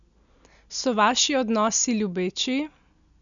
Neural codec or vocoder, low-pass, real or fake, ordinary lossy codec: none; 7.2 kHz; real; none